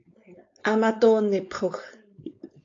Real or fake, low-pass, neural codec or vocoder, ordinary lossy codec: fake; 7.2 kHz; codec, 16 kHz, 4.8 kbps, FACodec; AAC, 48 kbps